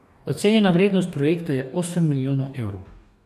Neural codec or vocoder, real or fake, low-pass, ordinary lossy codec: codec, 44.1 kHz, 2.6 kbps, DAC; fake; 14.4 kHz; none